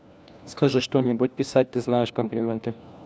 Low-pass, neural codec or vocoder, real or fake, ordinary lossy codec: none; codec, 16 kHz, 1 kbps, FunCodec, trained on LibriTTS, 50 frames a second; fake; none